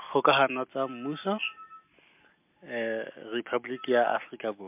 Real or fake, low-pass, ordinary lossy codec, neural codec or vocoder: real; 3.6 kHz; MP3, 32 kbps; none